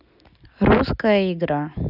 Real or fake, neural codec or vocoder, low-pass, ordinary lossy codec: real; none; 5.4 kHz; none